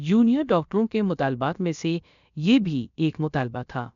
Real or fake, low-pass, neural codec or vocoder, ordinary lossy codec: fake; 7.2 kHz; codec, 16 kHz, about 1 kbps, DyCAST, with the encoder's durations; none